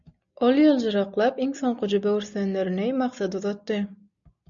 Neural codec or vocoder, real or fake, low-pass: none; real; 7.2 kHz